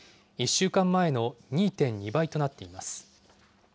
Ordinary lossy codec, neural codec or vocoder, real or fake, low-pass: none; none; real; none